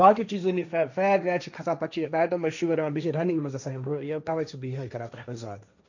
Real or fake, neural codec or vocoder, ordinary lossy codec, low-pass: fake; codec, 16 kHz, 1.1 kbps, Voila-Tokenizer; none; 7.2 kHz